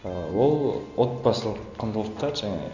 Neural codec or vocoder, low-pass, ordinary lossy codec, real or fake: none; 7.2 kHz; none; real